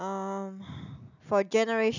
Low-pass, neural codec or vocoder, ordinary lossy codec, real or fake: 7.2 kHz; none; none; real